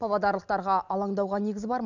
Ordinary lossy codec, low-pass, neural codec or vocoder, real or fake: none; 7.2 kHz; none; real